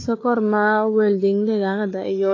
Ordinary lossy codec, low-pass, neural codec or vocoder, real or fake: AAC, 32 kbps; 7.2 kHz; codec, 16 kHz, 4 kbps, FunCodec, trained on Chinese and English, 50 frames a second; fake